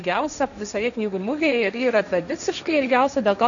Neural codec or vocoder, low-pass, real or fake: codec, 16 kHz, 1.1 kbps, Voila-Tokenizer; 7.2 kHz; fake